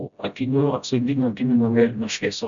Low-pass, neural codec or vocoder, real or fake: 7.2 kHz; codec, 16 kHz, 0.5 kbps, FreqCodec, smaller model; fake